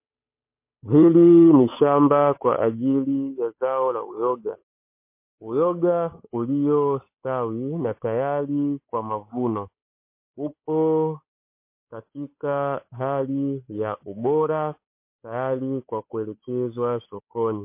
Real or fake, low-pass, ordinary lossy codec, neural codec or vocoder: fake; 3.6 kHz; MP3, 24 kbps; codec, 16 kHz, 8 kbps, FunCodec, trained on Chinese and English, 25 frames a second